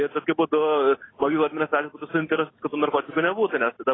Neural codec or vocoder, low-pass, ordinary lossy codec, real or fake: none; 7.2 kHz; AAC, 16 kbps; real